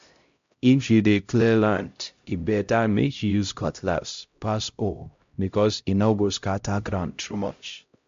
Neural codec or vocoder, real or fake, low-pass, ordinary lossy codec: codec, 16 kHz, 0.5 kbps, X-Codec, HuBERT features, trained on LibriSpeech; fake; 7.2 kHz; MP3, 48 kbps